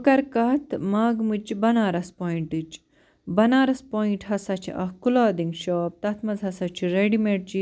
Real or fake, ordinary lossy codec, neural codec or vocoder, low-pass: real; none; none; none